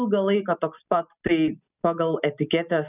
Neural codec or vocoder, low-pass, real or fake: none; 3.6 kHz; real